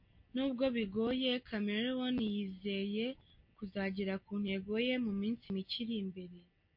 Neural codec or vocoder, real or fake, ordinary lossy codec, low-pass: none; real; MP3, 32 kbps; 5.4 kHz